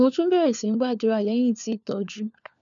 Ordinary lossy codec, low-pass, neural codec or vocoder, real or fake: none; 7.2 kHz; codec, 16 kHz, 4 kbps, FunCodec, trained on LibriTTS, 50 frames a second; fake